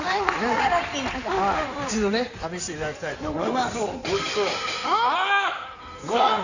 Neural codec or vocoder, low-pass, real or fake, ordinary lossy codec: codec, 16 kHz in and 24 kHz out, 2.2 kbps, FireRedTTS-2 codec; 7.2 kHz; fake; none